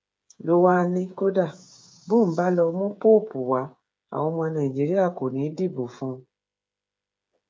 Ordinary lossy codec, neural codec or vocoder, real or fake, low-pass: none; codec, 16 kHz, 8 kbps, FreqCodec, smaller model; fake; none